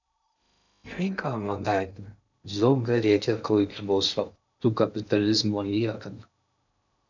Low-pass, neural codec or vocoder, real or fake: 7.2 kHz; codec, 16 kHz in and 24 kHz out, 0.6 kbps, FocalCodec, streaming, 2048 codes; fake